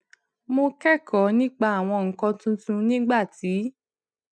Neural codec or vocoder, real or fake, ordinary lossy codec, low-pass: none; real; none; 9.9 kHz